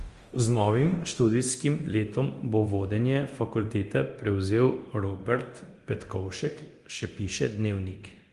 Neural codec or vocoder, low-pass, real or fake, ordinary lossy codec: codec, 24 kHz, 0.9 kbps, DualCodec; 10.8 kHz; fake; Opus, 24 kbps